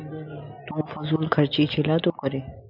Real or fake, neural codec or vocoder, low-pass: real; none; 5.4 kHz